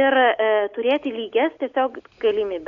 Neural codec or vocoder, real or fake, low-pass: none; real; 7.2 kHz